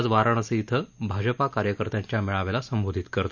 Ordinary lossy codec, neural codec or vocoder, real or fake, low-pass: none; none; real; 7.2 kHz